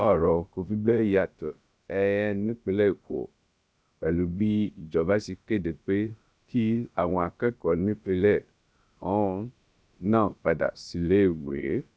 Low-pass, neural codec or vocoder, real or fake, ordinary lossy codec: none; codec, 16 kHz, about 1 kbps, DyCAST, with the encoder's durations; fake; none